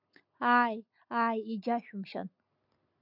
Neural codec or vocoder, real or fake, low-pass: none; real; 5.4 kHz